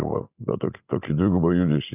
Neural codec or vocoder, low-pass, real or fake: none; 3.6 kHz; real